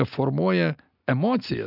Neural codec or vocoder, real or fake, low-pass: none; real; 5.4 kHz